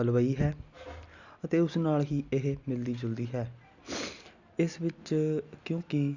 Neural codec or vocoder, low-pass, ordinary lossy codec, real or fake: none; 7.2 kHz; Opus, 64 kbps; real